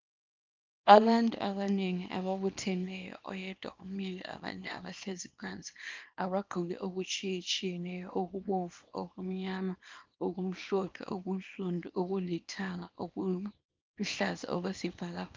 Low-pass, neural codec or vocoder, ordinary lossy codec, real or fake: 7.2 kHz; codec, 24 kHz, 0.9 kbps, WavTokenizer, small release; Opus, 32 kbps; fake